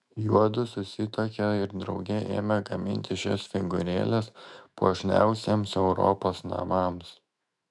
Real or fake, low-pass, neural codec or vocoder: fake; 10.8 kHz; autoencoder, 48 kHz, 128 numbers a frame, DAC-VAE, trained on Japanese speech